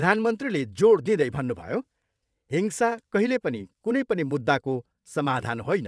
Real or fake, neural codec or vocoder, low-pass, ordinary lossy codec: fake; vocoder, 22.05 kHz, 80 mel bands, WaveNeXt; none; none